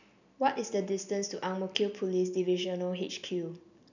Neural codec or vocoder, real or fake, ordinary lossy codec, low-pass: none; real; none; 7.2 kHz